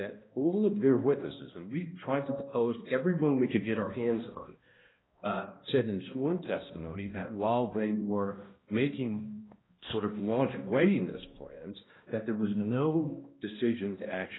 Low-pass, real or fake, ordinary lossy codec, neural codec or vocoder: 7.2 kHz; fake; AAC, 16 kbps; codec, 16 kHz, 1 kbps, X-Codec, HuBERT features, trained on balanced general audio